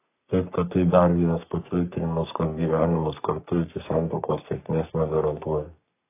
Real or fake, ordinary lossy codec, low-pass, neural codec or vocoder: fake; AAC, 24 kbps; 3.6 kHz; codec, 44.1 kHz, 3.4 kbps, Pupu-Codec